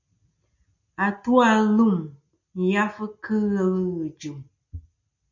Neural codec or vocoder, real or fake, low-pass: none; real; 7.2 kHz